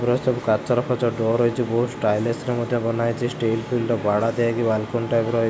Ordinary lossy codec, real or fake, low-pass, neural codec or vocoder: none; real; none; none